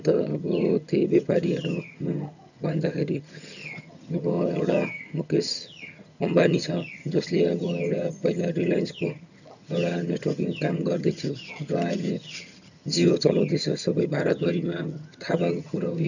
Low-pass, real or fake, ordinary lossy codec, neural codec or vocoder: 7.2 kHz; fake; none; vocoder, 22.05 kHz, 80 mel bands, HiFi-GAN